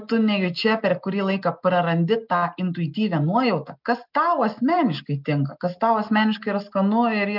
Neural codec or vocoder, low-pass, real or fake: none; 5.4 kHz; real